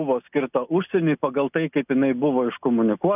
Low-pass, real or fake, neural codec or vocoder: 3.6 kHz; real; none